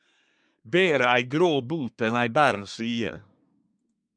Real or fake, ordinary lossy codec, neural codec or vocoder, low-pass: fake; MP3, 96 kbps; codec, 24 kHz, 1 kbps, SNAC; 9.9 kHz